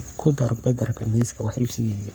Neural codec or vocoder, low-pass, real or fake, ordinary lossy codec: codec, 44.1 kHz, 3.4 kbps, Pupu-Codec; none; fake; none